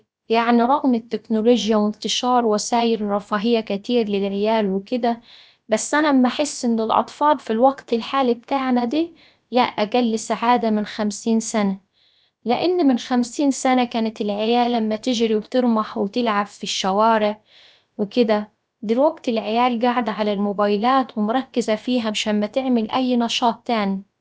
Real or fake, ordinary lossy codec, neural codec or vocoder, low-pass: fake; none; codec, 16 kHz, about 1 kbps, DyCAST, with the encoder's durations; none